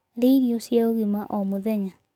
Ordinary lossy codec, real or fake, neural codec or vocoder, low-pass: none; fake; codec, 44.1 kHz, 7.8 kbps, DAC; 19.8 kHz